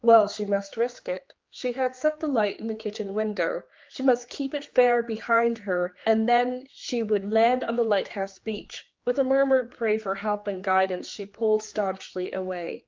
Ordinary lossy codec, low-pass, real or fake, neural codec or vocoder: Opus, 24 kbps; 7.2 kHz; fake; codec, 16 kHz, 4 kbps, X-Codec, HuBERT features, trained on general audio